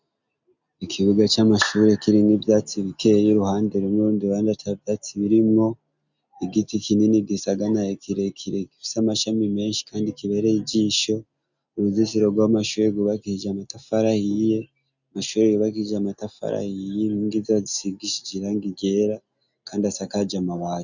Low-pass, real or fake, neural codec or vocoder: 7.2 kHz; real; none